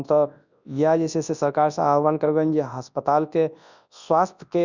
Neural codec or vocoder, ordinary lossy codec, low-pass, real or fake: codec, 24 kHz, 0.9 kbps, WavTokenizer, large speech release; none; 7.2 kHz; fake